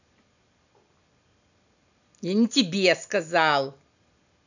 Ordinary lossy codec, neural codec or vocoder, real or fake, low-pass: none; none; real; 7.2 kHz